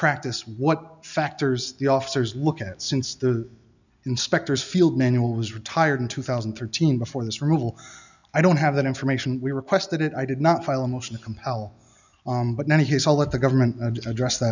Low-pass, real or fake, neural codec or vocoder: 7.2 kHz; real; none